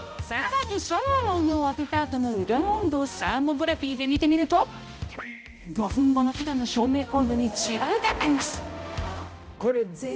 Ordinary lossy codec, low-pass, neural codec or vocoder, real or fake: none; none; codec, 16 kHz, 0.5 kbps, X-Codec, HuBERT features, trained on balanced general audio; fake